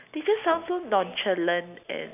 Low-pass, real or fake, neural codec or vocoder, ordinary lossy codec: 3.6 kHz; real; none; none